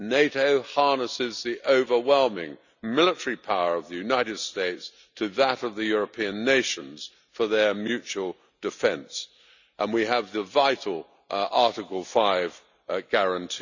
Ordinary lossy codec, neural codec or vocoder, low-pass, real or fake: none; none; 7.2 kHz; real